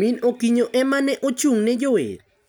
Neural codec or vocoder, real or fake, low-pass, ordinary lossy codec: none; real; none; none